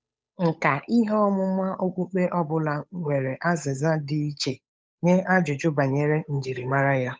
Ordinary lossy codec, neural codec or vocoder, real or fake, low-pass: none; codec, 16 kHz, 8 kbps, FunCodec, trained on Chinese and English, 25 frames a second; fake; none